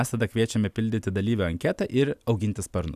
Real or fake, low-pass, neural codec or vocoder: real; 14.4 kHz; none